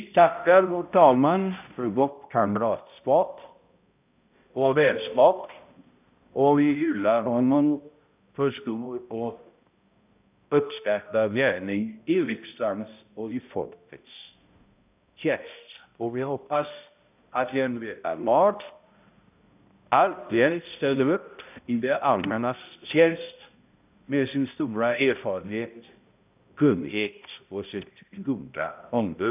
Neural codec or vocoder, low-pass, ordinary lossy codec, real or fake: codec, 16 kHz, 0.5 kbps, X-Codec, HuBERT features, trained on balanced general audio; 3.6 kHz; none; fake